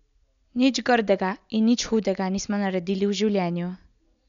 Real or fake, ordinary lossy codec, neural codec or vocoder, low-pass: real; none; none; 7.2 kHz